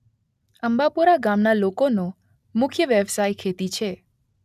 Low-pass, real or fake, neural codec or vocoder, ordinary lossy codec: 14.4 kHz; real; none; none